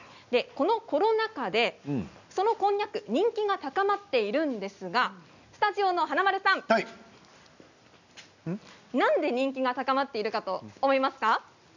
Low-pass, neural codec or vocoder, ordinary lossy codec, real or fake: 7.2 kHz; none; none; real